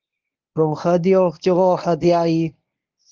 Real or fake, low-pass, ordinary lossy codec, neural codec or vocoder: fake; 7.2 kHz; Opus, 32 kbps; codec, 24 kHz, 0.9 kbps, WavTokenizer, medium speech release version 1